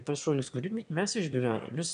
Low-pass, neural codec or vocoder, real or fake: 9.9 kHz; autoencoder, 22.05 kHz, a latent of 192 numbers a frame, VITS, trained on one speaker; fake